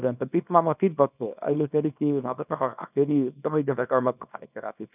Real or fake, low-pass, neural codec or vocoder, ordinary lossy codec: fake; 3.6 kHz; codec, 24 kHz, 0.9 kbps, WavTokenizer, small release; none